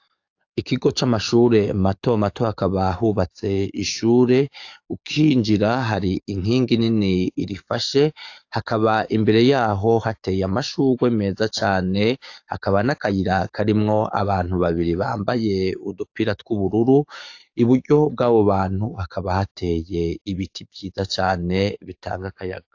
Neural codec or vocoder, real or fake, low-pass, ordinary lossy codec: codec, 44.1 kHz, 7.8 kbps, DAC; fake; 7.2 kHz; AAC, 48 kbps